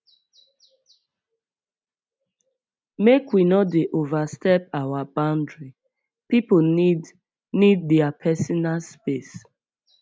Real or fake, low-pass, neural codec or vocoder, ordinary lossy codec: real; none; none; none